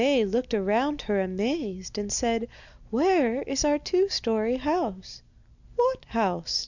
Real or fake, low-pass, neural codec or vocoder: real; 7.2 kHz; none